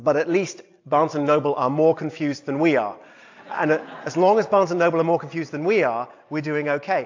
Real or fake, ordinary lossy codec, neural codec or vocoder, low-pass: real; AAC, 48 kbps; none; 7.2 kHz